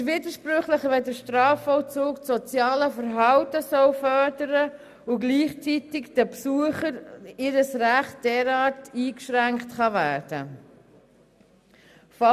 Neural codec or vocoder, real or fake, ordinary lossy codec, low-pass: none; real; none; 14.4 kHz